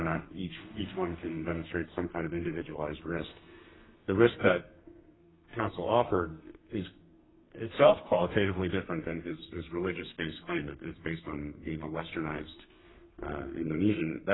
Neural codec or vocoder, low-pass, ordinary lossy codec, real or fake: codec, 32 kHz, 1.9 kbps, SNAC; 7.2 kHz; AAC, 16 kbps; fake